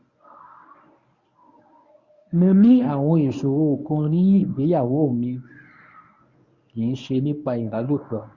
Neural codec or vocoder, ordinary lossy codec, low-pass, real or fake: codec, 24 kHz, 0.9 kbps, WavTokenizer, medium speech release version 1; Opus, 64 kbps; 7.2 kHz; fake